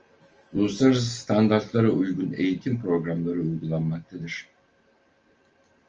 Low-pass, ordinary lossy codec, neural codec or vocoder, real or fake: 7.2 kHz; Opus, 24 kbps; none; real